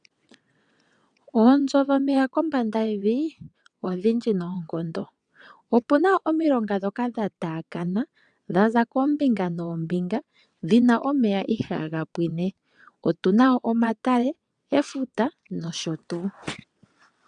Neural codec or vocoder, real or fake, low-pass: vocoder, 22.05 kHz, 80 mel bands, WaveNeXt; fake; 9.9 kHz